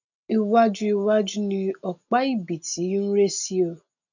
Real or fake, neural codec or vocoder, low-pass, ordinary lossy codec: real; none; 7.2 kHz; none